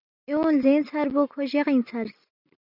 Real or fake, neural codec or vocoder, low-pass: real; none; 5.4 kHz